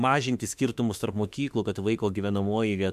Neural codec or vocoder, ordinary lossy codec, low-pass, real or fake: autoencoder, 48 kHz, 32 numbers a frame, DAC-VAE, trained on Japanese speech; MP3, 96 kbps; 14.4 kHz; fake